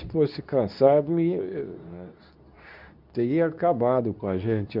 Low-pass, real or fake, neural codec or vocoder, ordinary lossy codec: 5.4 kHz; fake; codec, 24 kHz, 0.9 kbps, WavTokenizer, medium speech release version 2; none